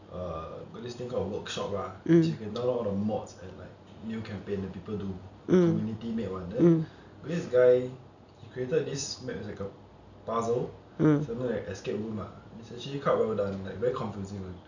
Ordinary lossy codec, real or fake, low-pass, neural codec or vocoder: none; real; 7.2 kHz; none